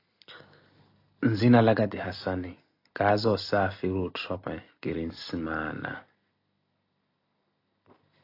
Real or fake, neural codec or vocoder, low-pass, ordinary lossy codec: real; none; 5.4 kHz; AAC, 32 kbps